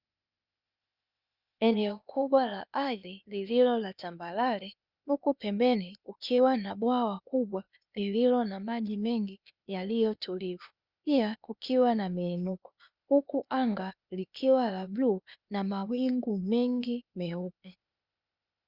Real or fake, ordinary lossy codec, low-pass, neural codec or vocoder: fake; Opus, 64 kbps; 5.4 kHz; codec, 16 kHz, 0.8 kbps, ZipCodec